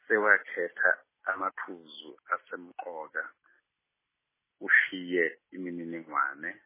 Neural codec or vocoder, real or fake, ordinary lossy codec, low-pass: none; real; MP3, 16 kbps; 3.6 kHz